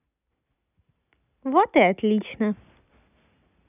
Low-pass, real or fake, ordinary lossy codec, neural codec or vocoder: 3.6 kHz; real; none; none